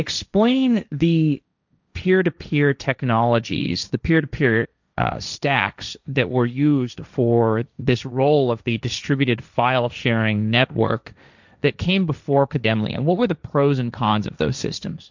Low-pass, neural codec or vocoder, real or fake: 7.2 kHz; codec, 16 kHz, 1.1 kbps, Voila-Tokenizer; fake